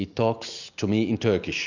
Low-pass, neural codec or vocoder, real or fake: 7.2 kHz; none; real